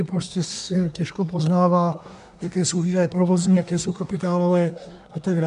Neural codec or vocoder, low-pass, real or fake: codec, 24 kHz, 1 kbps, SNAC; 10.8 kHz; fake